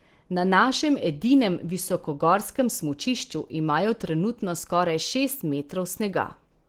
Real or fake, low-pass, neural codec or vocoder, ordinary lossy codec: real; 19.8 kHz; none; Opus, 16 kbps